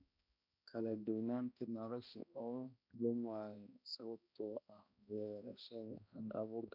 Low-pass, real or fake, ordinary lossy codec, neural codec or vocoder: 5.4 kHz; fake; none; codec, 16 kHz, 1 kbps, X-Codec, HuBERT features, trained on balanced general audio